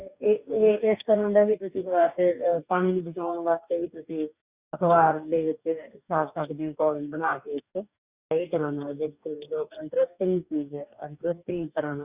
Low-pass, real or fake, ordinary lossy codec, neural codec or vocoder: 3.6 kHz; fake; Opus, 64 kbps; codec, 44.1 kHz, 2.6 kbps, DAC